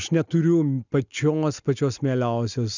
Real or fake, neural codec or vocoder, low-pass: real; none; 7.2 kHz